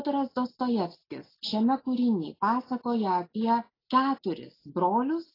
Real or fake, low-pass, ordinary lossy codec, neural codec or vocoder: real; 5.4 kHz; AAC, 24 kbps; none